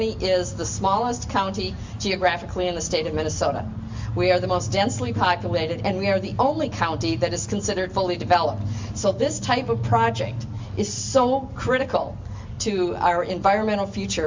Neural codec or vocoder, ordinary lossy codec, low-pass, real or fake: none; MP3, 64 kbps; 7.2 kHz; real